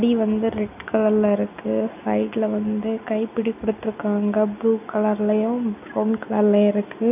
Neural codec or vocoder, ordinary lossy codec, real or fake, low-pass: none; none; real; 3.6 kHz